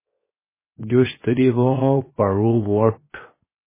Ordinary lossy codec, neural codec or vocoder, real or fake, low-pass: MP3, 16 kbps; codec, 16 kHz, 0.7 kbps, FocalCodec; fake; 3.6 kHz